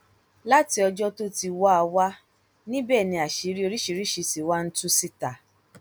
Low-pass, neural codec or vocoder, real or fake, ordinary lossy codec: none; none; real; none